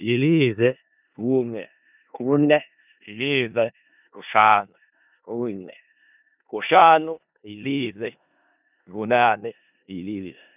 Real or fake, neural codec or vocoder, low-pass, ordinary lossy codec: fake; codec, 16 kHz in and 24 kHz out, 0.4 kbps, LongCat-Audio-Codec, four codebook decoder; 3.6 kHz; none